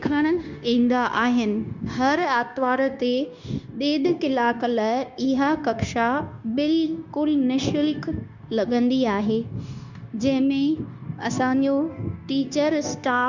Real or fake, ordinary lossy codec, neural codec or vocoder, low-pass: fake; Opus, 64 kbps; codec, 16 kHz, 0.9 kbps, LongCat-Audio-Codec; 7.2 kHz